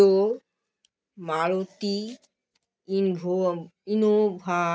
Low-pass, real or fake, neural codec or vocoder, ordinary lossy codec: none; real; none; none